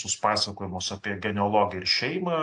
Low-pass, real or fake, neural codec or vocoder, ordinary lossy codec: 10.8 kHz; real; none; AAC, 64 kbps